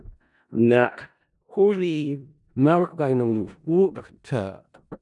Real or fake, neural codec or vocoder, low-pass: fake; codec, 16 kHz in and 24 kHz out, 0.4 kbps, LongCat-Audio-Codec, four codebook decoder; 10.8 kHz